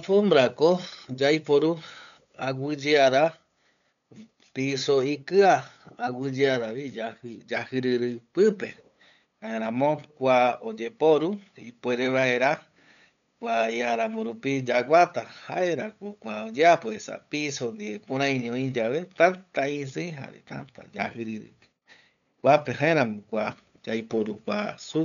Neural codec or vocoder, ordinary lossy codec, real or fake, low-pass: codec, 16 kHz, 8 kbps, FunCodec, trained on LibriTTS, 25 frames a second; none; fake; 7.2 kHz